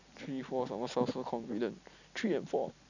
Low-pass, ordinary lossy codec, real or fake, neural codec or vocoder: 7.2 kHz; none; fake; vocoder, 44.1 kHz, 128 mel bands every 256 samples, BigVGAN v2